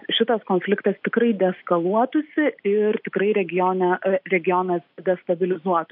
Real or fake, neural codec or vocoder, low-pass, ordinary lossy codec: real; none; 5.4 kHz; MP3, 48 kbps